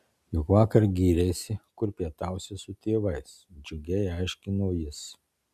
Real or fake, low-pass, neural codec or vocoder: real; 14.4 kHz; none